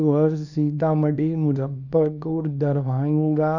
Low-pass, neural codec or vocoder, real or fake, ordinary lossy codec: 7.2 kHz; codec, 24 kHz, 0.9 kbps, WavTokenizer, small release; fake; none